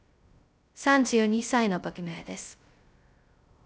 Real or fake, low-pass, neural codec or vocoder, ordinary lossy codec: fake; none; codec, 16 kHz, 0.2 kbps, FocalCodec; none